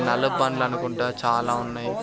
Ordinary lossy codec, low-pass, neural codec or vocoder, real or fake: none; none; none; real